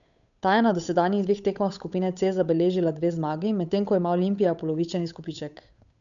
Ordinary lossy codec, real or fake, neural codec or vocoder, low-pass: none; fake; codec, 16 kHz, 8 kbps, FunCodec, trained on Chinese and English, 25 frames a second; 7.2 kHz